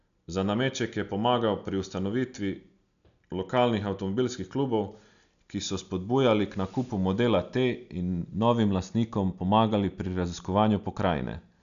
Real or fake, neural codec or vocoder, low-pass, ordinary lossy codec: real; none; 7.2 kHz; none